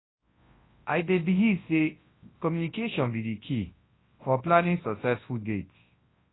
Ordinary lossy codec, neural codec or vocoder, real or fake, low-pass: AAC, 16 kbps; codec, 24 kHz, 0.9 kbps, WavTokenizer, large speech release; fake; 7.2 kHz